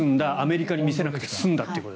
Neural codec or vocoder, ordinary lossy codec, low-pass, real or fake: none; none; none; real